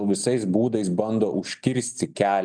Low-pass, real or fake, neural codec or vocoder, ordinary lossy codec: 9.9 kHz; real; none; Opus, 24 kbps